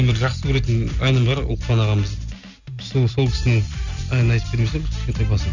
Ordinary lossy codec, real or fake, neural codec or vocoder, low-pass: none; real; none; 7.2 kHz